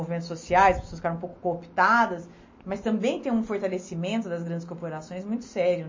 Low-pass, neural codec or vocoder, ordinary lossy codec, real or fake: 7.2 kHz; none; MP3, 32 kbps; real